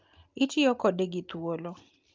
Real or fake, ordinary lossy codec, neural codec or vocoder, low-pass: real; Opus, 24 kbps; none; 7.2 kHz